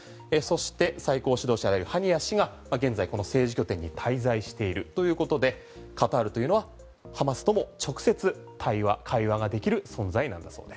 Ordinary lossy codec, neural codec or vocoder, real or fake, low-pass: none; none; real; none